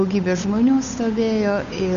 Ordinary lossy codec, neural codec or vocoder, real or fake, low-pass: AAC, 96 kbps; codec, 16 kHz, 8 kbps, FunCodec, trained on Chinese and English, 25 frames a second; fake; 7.2 kHz